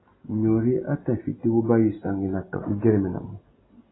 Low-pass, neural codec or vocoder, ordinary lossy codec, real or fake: 7.2 kHz; none; AAC, 16 kbps; real